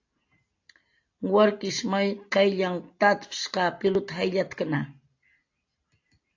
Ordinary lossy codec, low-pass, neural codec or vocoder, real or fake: AAC, 48 kbps; 7.2 kHz; none; real